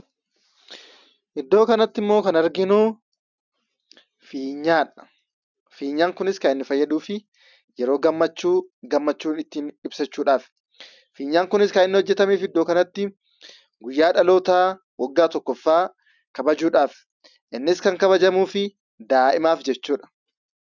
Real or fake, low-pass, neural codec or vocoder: real; 7.2 kHz; none